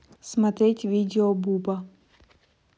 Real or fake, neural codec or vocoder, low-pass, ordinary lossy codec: real; none; none; none